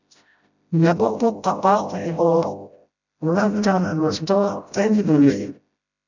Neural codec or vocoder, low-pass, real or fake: codec, 16 kHz, 0.5 kbps, FreqCodec, smaller model; 7.2 kHz; fake